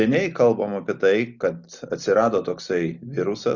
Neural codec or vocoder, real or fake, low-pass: none; real; 7.2 kHz